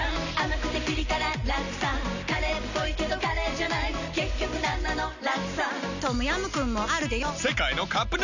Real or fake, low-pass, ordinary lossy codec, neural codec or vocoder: real; 7.2 kHz; none; none